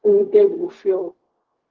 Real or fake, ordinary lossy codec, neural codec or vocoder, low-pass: fake; Opus, 16 kbps; codec, 16 kHz, 0.4 kbps, LongCat-Audio-Codec; 7.2 kHz